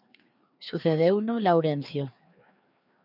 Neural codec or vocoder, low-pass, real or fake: codec, 16 kHz, 2 kbps, FreqCodec, larger model; 5.4 kHz; fake